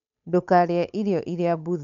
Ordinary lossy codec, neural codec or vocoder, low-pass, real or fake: none; codec, 16 kHz, 8 kbps, FunCodec, trained on Chinese and English, 25 frames a second; 7.2 kHz; fake